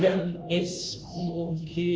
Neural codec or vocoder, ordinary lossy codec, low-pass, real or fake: codec, 16 kHz, 0.5 kbps, FunCodec, trained on Chinese and English, 25 frames a second; none; none; fake